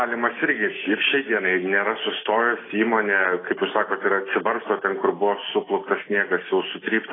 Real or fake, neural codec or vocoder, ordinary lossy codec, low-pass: real; none; AAC, 16 kbps; 7.2 kHz